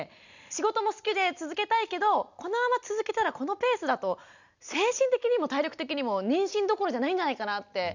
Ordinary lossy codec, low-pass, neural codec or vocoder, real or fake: none; 7.2 kHz; none; real